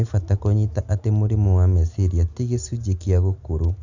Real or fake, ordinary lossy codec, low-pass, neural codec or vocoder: real; none; 7.2 kHz; none